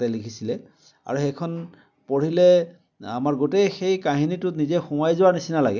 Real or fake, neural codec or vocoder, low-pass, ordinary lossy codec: real; none; 7.2 kHz; none